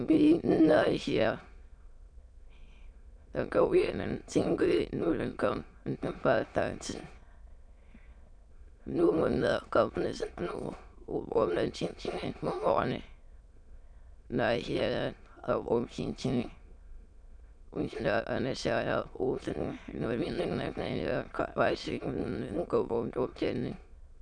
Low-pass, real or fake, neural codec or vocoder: 9.9 kHz; fake; autoencoder, 22.05 kHz, a latent of 192 numbers a frame, VITS, trained on many speakers